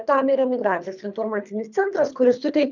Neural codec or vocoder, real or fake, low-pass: codec, 24 kHz, 3 kbps, HILCodec; fake; 7.2 kHz